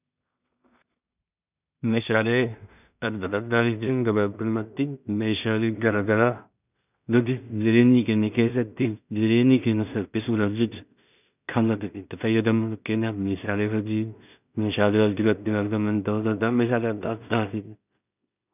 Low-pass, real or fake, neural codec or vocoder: 3.6 kHz; fake; codec, 16 kHz in and 24 kHz out, 0.4 kbps, LongCat-Audio-Codec, two codebook decoder